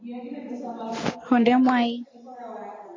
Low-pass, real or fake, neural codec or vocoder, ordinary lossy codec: 7.2 kHz; real; none; AAC, 32 kbps